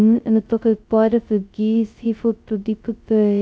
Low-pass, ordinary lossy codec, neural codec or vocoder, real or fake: none; none; codec, 16 kHz, 0.2 kbps, FocalCodec; fake